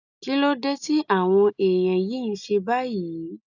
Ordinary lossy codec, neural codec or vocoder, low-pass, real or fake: none; none; 7.2 kHz; real